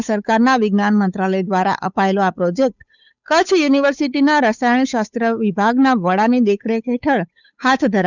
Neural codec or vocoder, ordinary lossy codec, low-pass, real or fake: codec, 16 kHz, 8 kbps, FunCodec, trained on Chinese and English, 25 frames a second; none; 7.2 kHz; fake